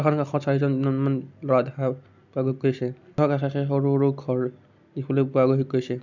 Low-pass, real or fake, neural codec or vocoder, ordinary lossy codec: 7.2 kHz; real; none; none